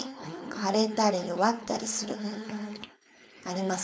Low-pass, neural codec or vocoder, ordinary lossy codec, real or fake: none; codec, 16 kHz, 4.8 kbps, FACodec; none; fake